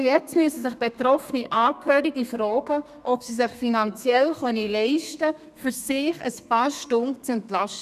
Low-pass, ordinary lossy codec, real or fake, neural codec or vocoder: 14.4 kHz; none; fake; codec, 32 kHz, 1.9 kbps, SNAC